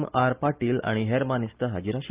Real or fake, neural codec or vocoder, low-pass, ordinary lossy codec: real; none; 3.6 kHz; Opus, 24 kbps